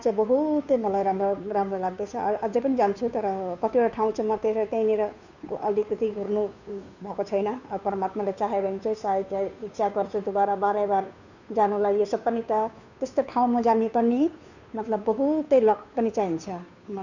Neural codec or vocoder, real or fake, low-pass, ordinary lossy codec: codec, 16 kHz, 2 kbps, FunCodec, trained on Chinese and English, 25 frames a second; fake; 7.2 kHz; none